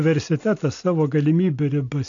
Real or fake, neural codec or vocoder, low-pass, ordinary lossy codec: real; none; 7.2 kHz; AAC, 64 kbps